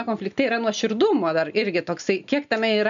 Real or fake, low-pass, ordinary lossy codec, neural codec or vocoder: real; 7.2 kHz; MP3, 96 kbps; none